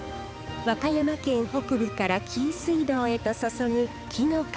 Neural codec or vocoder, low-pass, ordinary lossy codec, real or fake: codec, 16 kHz, 4 kbps, X-Codec, HuBERT features, trained on balanced general audio; none; none; fake